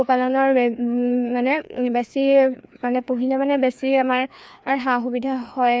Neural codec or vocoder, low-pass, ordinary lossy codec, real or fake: codec, 16 kHz, 2 kbps, FreqCodec, larger model; none; none; fake